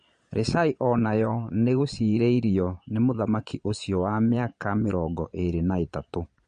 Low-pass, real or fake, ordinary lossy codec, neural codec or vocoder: 9.9 kHz; fake; MP3, 48 kbps; vocoder, 22.05 kHz, 80 mel bands, Vocos